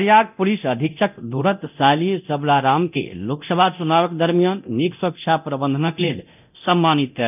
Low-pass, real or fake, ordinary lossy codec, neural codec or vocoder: 3.6 kHz; fake; none; codec, 24 kHz, 0.9 kbps, DualCodec